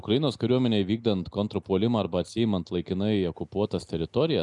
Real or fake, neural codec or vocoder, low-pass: real; none; 10.8 kHz